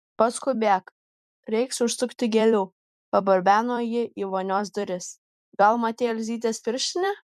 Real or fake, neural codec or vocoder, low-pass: fake; vocoder, 44.1 kHz, 128 mel bands every 256 samples, BigVGAN v2; 14.4 kHz